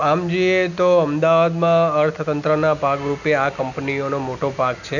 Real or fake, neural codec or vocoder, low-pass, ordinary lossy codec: real; none; 7.2 kHz; none